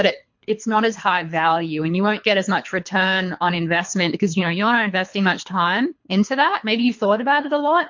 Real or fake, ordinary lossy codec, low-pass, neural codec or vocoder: fake; MP3, 48 kbps; 7.2 kHz; codec, 24 kHz, 3 kbps, HILCodec